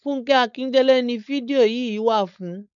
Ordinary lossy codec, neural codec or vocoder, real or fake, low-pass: none; codec, 16 kHz, 4.8 kbps, FACodec; fake; 7.2 kHz